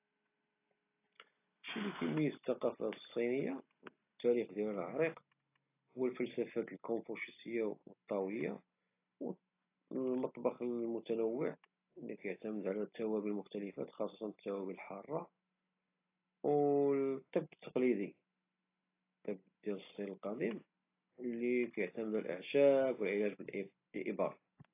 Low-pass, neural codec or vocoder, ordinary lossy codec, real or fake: 3.6 kHz; none; AAC, 24 kbps; real